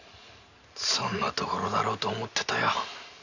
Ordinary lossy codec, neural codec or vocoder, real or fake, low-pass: none; none; real; 7.2 kHz